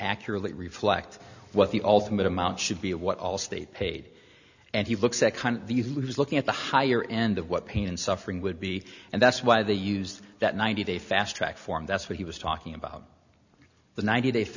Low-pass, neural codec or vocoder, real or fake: 7.2 kHz; none; real